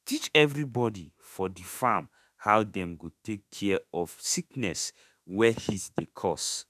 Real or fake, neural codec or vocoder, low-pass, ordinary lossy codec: fake; autoencoder, 48 kHz, 32 numbers a frame, DAC-VAE, trained on Japanese speech; 14.4 kHz; none